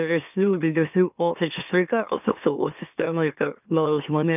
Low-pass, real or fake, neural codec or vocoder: 3.6 kHz; fake; autoencoder, 44.1 kHz, a latent of 192 numbers a frame, MeloTTS